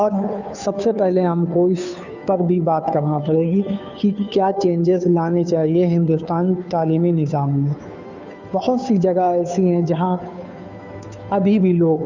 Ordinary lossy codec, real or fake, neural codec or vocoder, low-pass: none; fake; codec, 16 kHz, 2 kbps, FunCodec, trained on Chinese and English, 25 frames a second; 7.2 kHz